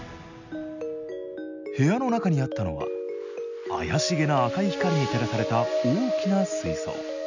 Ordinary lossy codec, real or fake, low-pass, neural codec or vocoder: none; real; 7.2 kHz; none